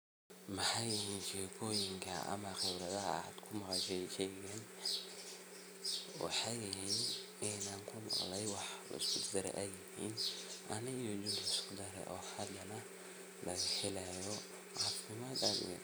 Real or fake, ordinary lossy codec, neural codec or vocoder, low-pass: real; none; none; none